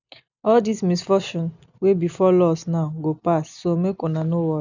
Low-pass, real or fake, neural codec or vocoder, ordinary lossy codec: 7.2 kHz; real; none; none